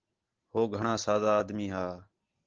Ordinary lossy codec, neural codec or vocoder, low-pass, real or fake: Opus, 16 kbps; none; 7.2 kHz; real